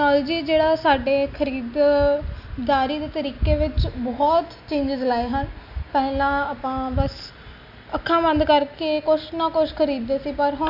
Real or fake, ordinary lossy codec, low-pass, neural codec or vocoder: real; AAC, 48 kbps; 5.4 kHz; none